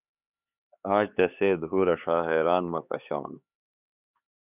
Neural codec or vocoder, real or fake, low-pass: codec, 16 kHz, 4 kbps, X-Codec, HuBERT features, trained on LibriSpeech; fake; 3.6 kHz